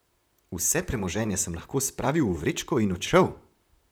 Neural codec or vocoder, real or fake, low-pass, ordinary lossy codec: vocoder, 44.1 kHz, 128 mel bands, Pupu-Vocoder; fake; none; none